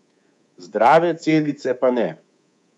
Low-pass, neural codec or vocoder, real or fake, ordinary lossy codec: 10.8 kHz; codec, 24 kHz, 3.1 kbps, DualCodec; fake; none